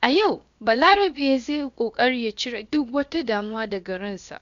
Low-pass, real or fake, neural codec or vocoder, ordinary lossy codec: 7.2 kHz; fake; codec, 16 kHz, about 1 kbps, DyCAST, with the encoder's durations; MP3, 64 kbps